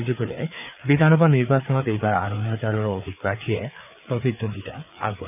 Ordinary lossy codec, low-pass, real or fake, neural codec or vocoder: none; 3.6 kHz; fake; codec, 16 kHz, 4 kbps, FreqCodec, larger model